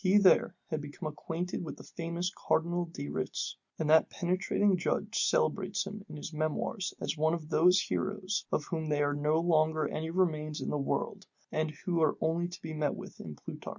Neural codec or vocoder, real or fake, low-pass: none; real; 7.2 kHz